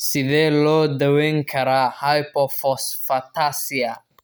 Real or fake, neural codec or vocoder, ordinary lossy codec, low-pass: real; none; none; none